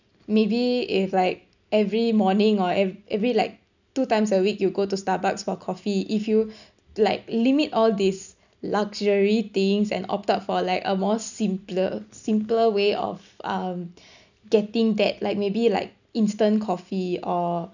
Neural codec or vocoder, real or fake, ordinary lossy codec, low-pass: none; real; none; 7.2 kHz